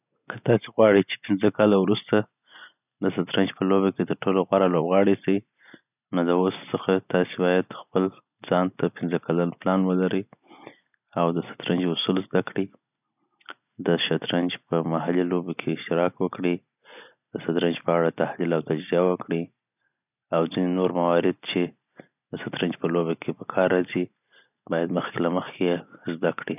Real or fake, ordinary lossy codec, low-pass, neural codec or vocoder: real; none; 3.6 kHz; none